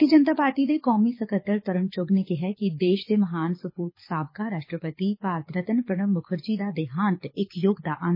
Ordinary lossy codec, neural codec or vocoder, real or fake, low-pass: MP3, 24 kbps; codec, 24 kHz, 6 kbps, HILCodec; fake; 5.4 kHz